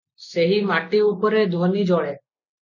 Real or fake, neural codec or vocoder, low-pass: real; none; 7.2 kHz